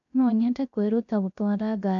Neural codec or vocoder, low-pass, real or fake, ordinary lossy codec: codec, 16 kHz, 0.3 kbps, FocalCodec; 7.2 kHz; fake; none